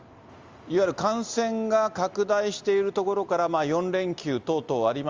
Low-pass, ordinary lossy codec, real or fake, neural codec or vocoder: 7.2 kHz; Opus, 32 kbps; real; none